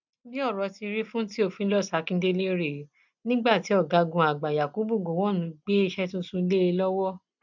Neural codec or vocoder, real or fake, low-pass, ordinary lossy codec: none; real; 7.2 kHz; none